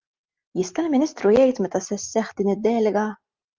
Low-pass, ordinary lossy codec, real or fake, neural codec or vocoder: 7.2 kHz; Opus, 24 kbps; real; none